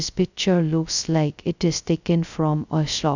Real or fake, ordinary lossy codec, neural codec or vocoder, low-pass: fake; none; codec, 16 kHz, 0.2 kbps, FocalCodec; 7.2 kHz